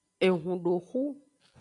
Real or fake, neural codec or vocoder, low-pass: real; none; 10.8 kHz